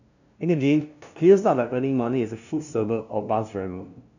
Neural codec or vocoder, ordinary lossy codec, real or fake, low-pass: codec, 16 kHz, 0.5 kbps, FunCodec, trained on LibriTTS, 25 frames a second; none; fake; 7.2 kHz